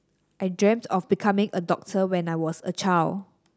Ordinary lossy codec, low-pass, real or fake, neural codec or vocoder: none; none; real; none